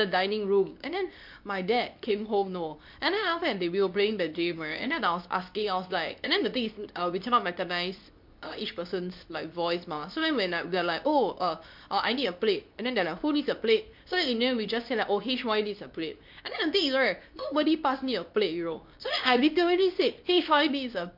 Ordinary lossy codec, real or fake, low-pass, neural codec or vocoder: MP3, 48 kbps; fake; 5.4 kHz; codec, 24 kHz, 0.9 kbps, WavTokenizer, medium speech release version 2